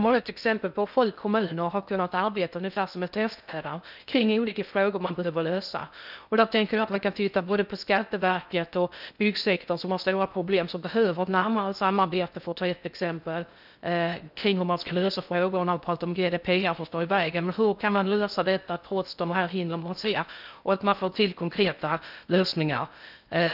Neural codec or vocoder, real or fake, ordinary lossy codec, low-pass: codec, 16 kHz in and 24 kHz out, 0.6 kbps, FocalCodec, streaming, 2048 codes; fake; none; 5.4 kHz